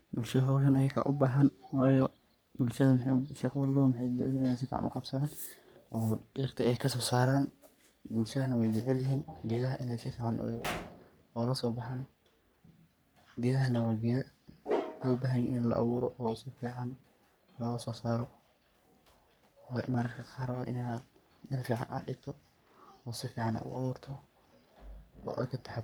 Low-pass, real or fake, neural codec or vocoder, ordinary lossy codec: none; fake; codec, 44.1 kHz, 3.4 kbps, Pupu-Codec; none